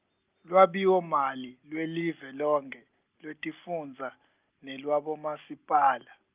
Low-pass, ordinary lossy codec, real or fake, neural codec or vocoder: 3.6 kHz; Opus, 24 kbps; real; none